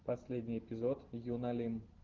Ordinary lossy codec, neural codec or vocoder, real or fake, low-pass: Opus, 16 kbps; none; real; 7.2 kHz